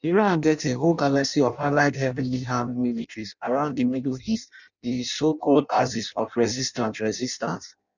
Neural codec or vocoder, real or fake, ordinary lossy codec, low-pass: codec, 16 kHz in and 24 kHz out, 0.6 kbps, FireRedTTS-2 codec; fake; none; 7.2 kHz